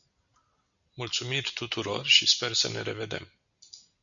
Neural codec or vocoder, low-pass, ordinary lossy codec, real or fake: none; 7.2 kHz; MP3, 96 kbps; real